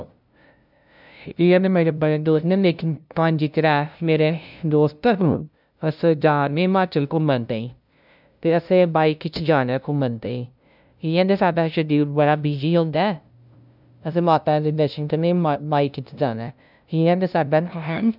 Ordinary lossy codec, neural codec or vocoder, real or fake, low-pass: none; codec, 16 kHz, 0.5 kbps, FunCodec, trained on LibriTTS, 25 frames a second; fake; 5.4 kHz